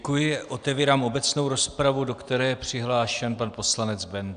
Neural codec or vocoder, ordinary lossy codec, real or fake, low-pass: none; AAC, 96 kbps; real; 9.9 kHz